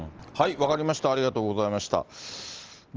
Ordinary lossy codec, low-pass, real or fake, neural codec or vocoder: Opus, 16 kbps; 7.2 kHz; real; none